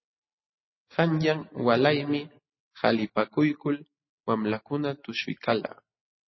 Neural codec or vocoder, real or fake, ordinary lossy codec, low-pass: none; real; MP3, 24 kbps; 7.2 kHz